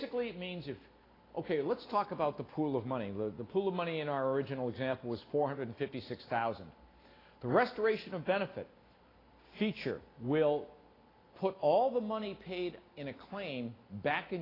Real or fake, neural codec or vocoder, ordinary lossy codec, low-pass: real; none; AAC, 24 kbps; 5.4 kHz